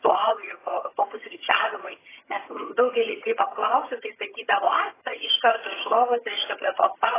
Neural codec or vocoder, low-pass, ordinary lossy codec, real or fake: vocoder, 22.05 kHz, 80 mel bands, HiFi-GAN; 3.6 kHz; AAC, 16 kbps; fake